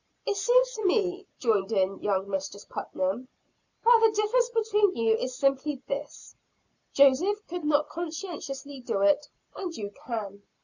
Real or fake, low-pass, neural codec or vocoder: real; 7.2 kHz; none